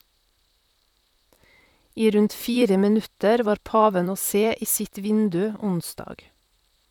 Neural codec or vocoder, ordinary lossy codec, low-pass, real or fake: vocoder, 44.1 kHz, 128 mel bands, Pupu-Vocoder; none; 19.8 kHz; fake